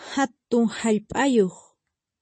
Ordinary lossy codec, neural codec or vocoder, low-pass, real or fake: MP3, 32 kbps; none; 9.9 kHz; real